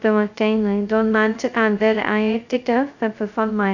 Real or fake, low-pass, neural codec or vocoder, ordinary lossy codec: fake; 7.2 kHz; codec, 16 kHz, 0.2 kbps, FocalCodec; none